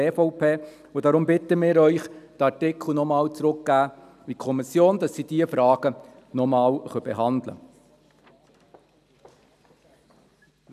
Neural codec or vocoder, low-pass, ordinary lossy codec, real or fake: none; 14.4 kHz; none; real